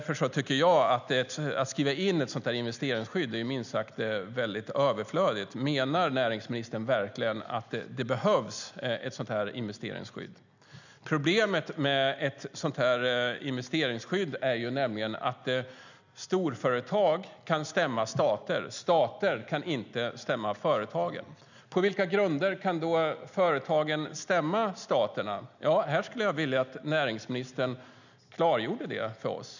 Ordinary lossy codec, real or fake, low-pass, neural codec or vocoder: none; real; 7.2 kHz; none